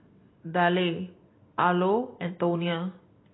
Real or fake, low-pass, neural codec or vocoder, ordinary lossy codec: real; 7.2 kHz; none; AAC, 16 kbps